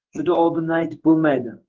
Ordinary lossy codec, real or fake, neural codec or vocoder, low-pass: Opus, 16 kbps; fake; codec, 16 kHz in and 24 kHz out, 1 kbps, XY-Tokenizer; 7.2 kHz